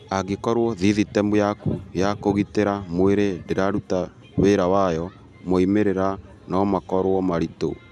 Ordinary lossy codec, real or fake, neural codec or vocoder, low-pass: none; real; none; none